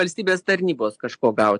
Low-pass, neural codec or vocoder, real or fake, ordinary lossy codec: 9.9 kHz; none; real; AAC, 64 kbps